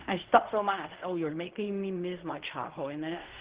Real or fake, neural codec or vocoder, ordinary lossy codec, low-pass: fake; codec, 16 kHz in and 24 kHz out, 0.4 kbps, LongCat-Audio-Codec, fine tuned four codebook decoder; Opus, 16 kbps; 3.6 kHz